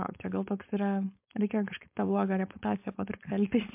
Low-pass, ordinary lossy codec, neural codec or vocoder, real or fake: 3.6 kHz; MP3, 32 kbps; codec, 16 kHz, 4.8 kbps, FACodec; fake